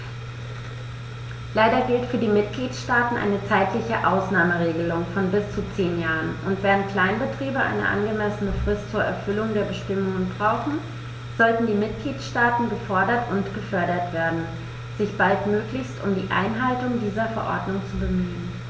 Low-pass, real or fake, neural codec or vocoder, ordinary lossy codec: none; real; none; none